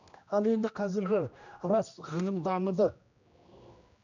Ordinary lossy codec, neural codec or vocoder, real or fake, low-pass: none; codec, 16 kHz, 1 kbps, X-Codec, HuBERT features, trained on general audio; fake; 7.2 kHz